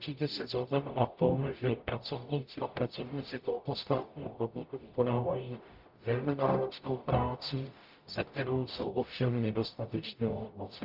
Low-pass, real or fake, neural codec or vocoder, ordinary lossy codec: 5.4 kHz; fake; codec, 44.1 kHz, 0.9 kbps, DAC; Opus, 24 kbps